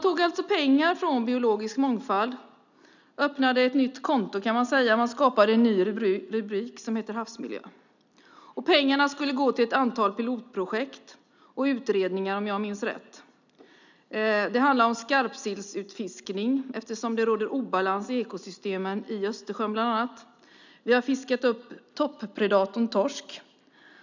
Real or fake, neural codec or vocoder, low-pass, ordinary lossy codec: real; none; 7.2 kHz; none